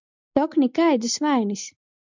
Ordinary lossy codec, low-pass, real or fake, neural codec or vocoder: MP3, 64 kbps; 7.2 kHz; real; none